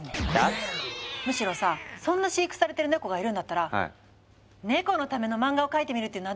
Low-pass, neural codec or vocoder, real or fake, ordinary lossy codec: none; none; real; none